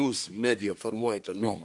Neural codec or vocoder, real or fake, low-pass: codec, 24 kHz, 1 kbps, SNAC; fake; 10.8 kHz